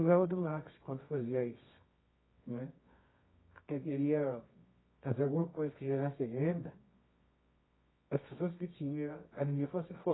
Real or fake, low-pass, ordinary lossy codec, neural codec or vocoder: fake; 7.2 kHz; AAC, 16 kbps; codec, 24 kHz, 0.9 kbps, WavTokenizer, medium music audio release